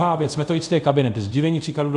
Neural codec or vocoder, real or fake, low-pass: codec, 24 kHz, 0.5 kbps, DualCodec; fake; 10.8 kHz